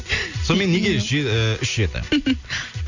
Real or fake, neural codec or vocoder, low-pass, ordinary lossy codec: real; none; 7.2 kHz; none